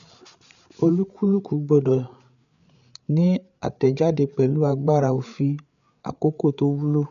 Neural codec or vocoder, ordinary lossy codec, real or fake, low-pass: codec, 16 kHz, 8 kbps, FreqCodec, larger model; none; fake; 7.2 kHz